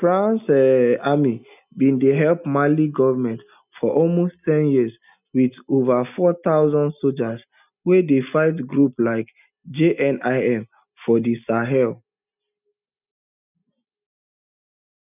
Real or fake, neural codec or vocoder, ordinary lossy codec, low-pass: real; none; none; 3.6 kHz